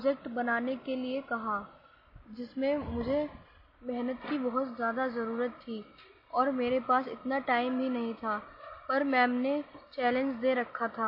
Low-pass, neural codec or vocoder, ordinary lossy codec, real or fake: 5.4 kHz; none; MP3, 24 kbps; real